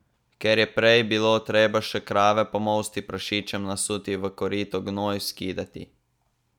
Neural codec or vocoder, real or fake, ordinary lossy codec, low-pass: none; real; none; 19.8 kHz